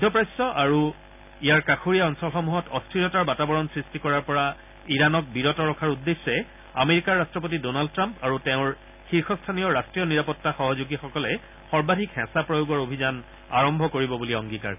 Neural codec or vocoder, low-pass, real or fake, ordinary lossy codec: none; 3.6 kHz; real; none